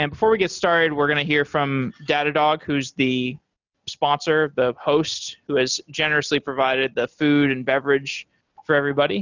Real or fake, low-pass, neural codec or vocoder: real; 7.2 kHz; none